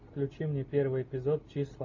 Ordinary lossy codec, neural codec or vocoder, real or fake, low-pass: AAC, 48 kbps; none; real; 7.2 kHz